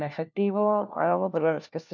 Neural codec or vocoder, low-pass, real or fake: codec, 16 kHz, 1 kbps, FunCodec, trained on LibriTTS, 50 frames a second; 7.2 kHz; fake